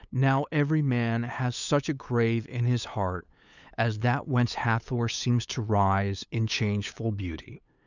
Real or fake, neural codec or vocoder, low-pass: fake; codec, 16 kHz, 8 kbps, FunCodec, trained on LibriTTS, 25 frames a second; 7.2 kHz